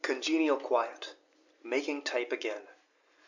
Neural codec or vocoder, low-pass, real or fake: none; 7.2 kHz; real